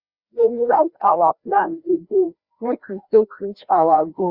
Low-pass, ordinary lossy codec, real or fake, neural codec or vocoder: 5.4 kHz; Opus, 64 kbps; fake; codec, 16 kHz, 1 kbps, FreqCodec, larger model